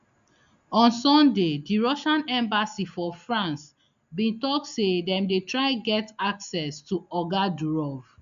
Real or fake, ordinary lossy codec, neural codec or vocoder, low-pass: real; AAC, 96 kbps; none; 7.2 kHz